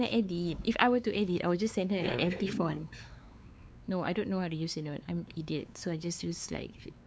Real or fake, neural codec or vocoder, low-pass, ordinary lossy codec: fake; codec, 16 kHz, 4 kbps, X-Codec, WavLM features, trained on Multilingual LibriSpeech; none; none